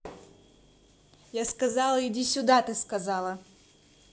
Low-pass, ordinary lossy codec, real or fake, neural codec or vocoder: none; none; real; none